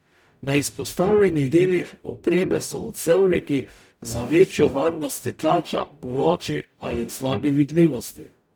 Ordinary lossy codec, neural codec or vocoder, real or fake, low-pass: none; codec, 44.1 kHz, 0.9 kbps, DAC; fake; none